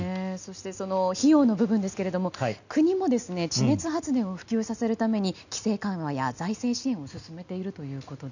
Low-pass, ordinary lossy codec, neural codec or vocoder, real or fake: 7.2 kHz; none; none; real